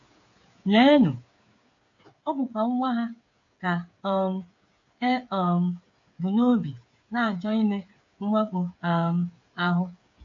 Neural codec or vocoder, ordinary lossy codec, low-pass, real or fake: codec, 16 kHz, 8 kbps, FreqCodec, smaller model; AAC, 48 kbps; 7.2 kHz; fake